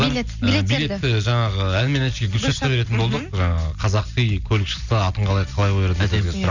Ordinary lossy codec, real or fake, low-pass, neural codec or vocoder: none; real; 7.2 kHz; none